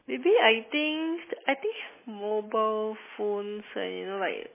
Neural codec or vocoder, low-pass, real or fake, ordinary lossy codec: none; 3.6 kHz; real; MP3, 16 kbps